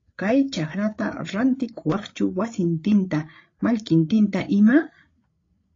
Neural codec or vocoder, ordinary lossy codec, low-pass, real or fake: codec, 16 kHz, 8 kbps, FreqCodec, larger model; AAC, 32 kbps; 7.2 kHz; fake